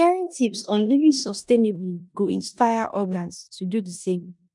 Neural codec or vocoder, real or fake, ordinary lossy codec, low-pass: codec, 16 kHz in and 24 kHz out, 0.9 kbps, LongCat-Audio-Codec, four codebook decoder; fake; none; 10.8 kHz